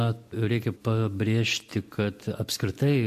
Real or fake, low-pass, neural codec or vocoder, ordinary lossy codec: real; 14.4 kHz; none; MP3, 64 kbps